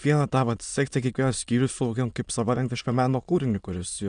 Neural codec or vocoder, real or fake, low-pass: autoencoder, 22.05 kHz, a latent of 192 numbers a frame, VITS, trained on many speakers; fake; 9.9 kHz